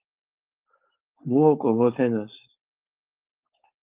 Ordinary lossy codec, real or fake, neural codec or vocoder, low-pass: Opus, 32 kbps; fake; codec, 16 kHz, 4.8 kbps, FACodec; 3.6 kHz